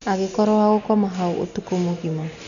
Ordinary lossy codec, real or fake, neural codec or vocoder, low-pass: none; real; none; 7.2 kHz